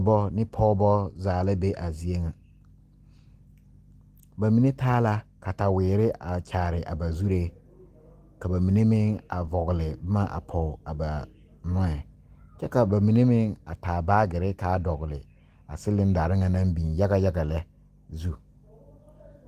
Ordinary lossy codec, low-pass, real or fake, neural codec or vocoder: Opus, 24 kbps; 14.4 kHz; real; none